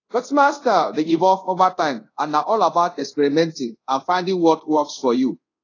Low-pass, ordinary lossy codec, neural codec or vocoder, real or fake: 7.2 kHz; AAC, 32 kbps; codec, 24 kHz, 0.5 kbps, DualCodec; fake